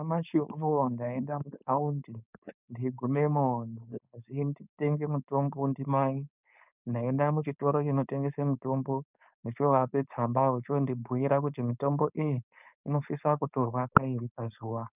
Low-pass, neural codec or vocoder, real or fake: 3.6 kHz; codec, 16 kHz, 4.8 kbps, FACodec; fake